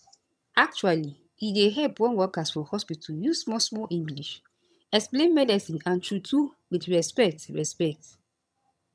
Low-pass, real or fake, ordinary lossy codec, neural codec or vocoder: none; fake; none; vocoder, 22.05 kHz, 80 mel bands, HiFi-GAN